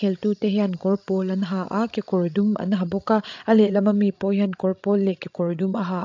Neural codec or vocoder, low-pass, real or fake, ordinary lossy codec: codec, 16 kHz, 16 kbps, FreqCodec, larger model; 7.2 kHz; fake; AAC, 48 kbps